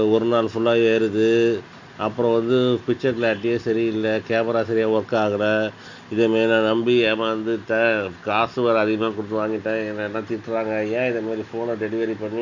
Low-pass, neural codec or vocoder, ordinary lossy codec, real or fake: 7.2 kHz; none; Opus, 64 kbps; real